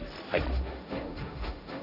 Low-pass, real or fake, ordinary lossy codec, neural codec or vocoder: 5.4 kHz; fake; none; codec, 16 kHz, 1.1 kbps, Voila-Tokenizer